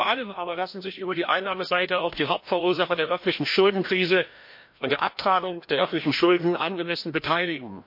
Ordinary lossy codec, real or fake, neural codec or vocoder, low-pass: MP3, 32 kbps; fake; codec, 16 kHz, 1 kbps, FreqCodec, larger model; 5.4 kHz